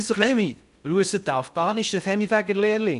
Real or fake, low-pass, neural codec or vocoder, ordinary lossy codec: fake; 10.8 kHz; codec, 16 kHz in and 24 kHz out, 0.6 kbps, FocalCodec, streaming, 2048 codes; none